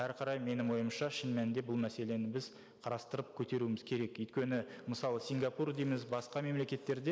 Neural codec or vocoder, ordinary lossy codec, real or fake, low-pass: none; none; real; none